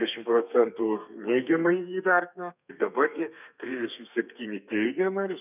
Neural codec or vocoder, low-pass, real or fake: codec, 32 kHz, 1.9 kbps, SNAC; 3.6 kHz; fake